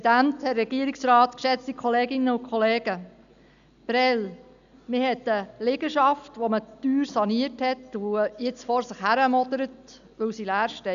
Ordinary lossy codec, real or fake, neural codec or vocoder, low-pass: none; real; none; 7.2 kHz